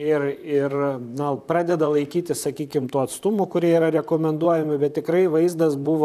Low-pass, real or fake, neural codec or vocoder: 14.4 kHz; fake; vocoder, 44.1 kHz, 128 mel bands, Pupu-Vocoder